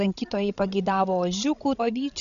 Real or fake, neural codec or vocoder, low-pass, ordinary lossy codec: fake; codec, 16 kHz, 16 kbps, FreqCodec, larger model; 7.2 kHz; Opus, 64 kbps